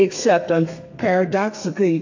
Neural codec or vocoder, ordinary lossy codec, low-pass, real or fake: codec, 32 kHz, 1.9 kbps, SNAC; AAC, 48 kbps; 7.2 kHz; fake